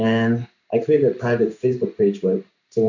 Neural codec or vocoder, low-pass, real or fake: codec, 16 kHz in and 24 kHz out, 1 kbps, XY-Tokenizer; 7.2 kHz; fake